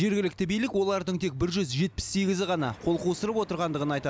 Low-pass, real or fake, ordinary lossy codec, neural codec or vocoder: none; real; none; none